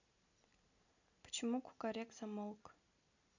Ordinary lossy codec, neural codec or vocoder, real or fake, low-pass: none; none; real; 7.2 kHz